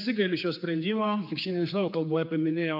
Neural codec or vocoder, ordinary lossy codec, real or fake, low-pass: codec, 16 kHz, 4 kbps, X-Codec, HuBERT features, trained on general audio; MP3, 48 kbps; fake; 5.4 kHz